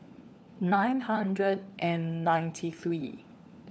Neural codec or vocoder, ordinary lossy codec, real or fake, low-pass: codec, 16 kHz, 4 kbps, FunCodec, trained on LibriTTS, 50 frames a second; none; fake; none